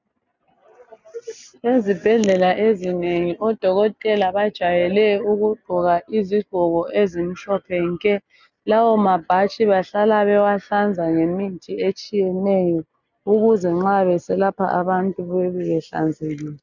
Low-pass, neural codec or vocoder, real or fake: 7.2 kHz; none; real